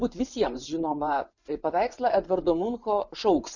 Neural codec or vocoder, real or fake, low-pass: vocoder, 24 kHz, 100 mel bands, Vocos; fake; 7.2 kHz